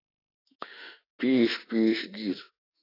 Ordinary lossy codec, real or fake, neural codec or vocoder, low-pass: AAC, 24 kbps; fake; autoencoder, 48 kHz, 32 numbers a frame, DAC-VAE, trained on Japanese speech; 5.4 kHz